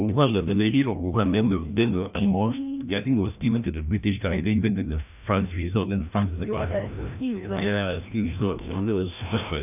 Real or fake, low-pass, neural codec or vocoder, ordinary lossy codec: fake; 3.6 kHz; codec, 16 kHz, 1 kbps, FreqCodec, larger model; none